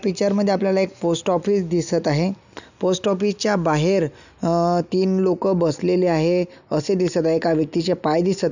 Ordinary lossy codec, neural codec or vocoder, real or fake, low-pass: none; none; real; 7.2 kHz